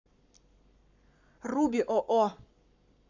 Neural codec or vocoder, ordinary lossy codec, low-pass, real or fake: codec, 44.1 kHz, 7.8 kbps, Pupu-Codec; none; 7.2 kHz; fake